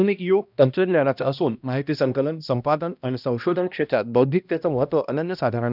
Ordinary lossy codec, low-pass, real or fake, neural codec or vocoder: none; 5.4 kHz; fake; codec, 16 kHz, 1 kbps, X-Codec, HuBERT features, trained on balanced general audio